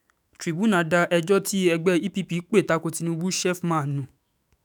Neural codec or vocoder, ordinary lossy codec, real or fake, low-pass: autoencoder, 48 kHz, 128 numbers a frame, DAC-VAE, trained on Japanese speech; none; fake; none